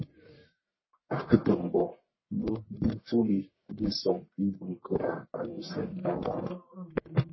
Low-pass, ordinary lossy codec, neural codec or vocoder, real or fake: 7.2 kHz; MP3, 24 kbps; codec, 44.1 kHz, 1.7 kbps, Pupu-Codec; fake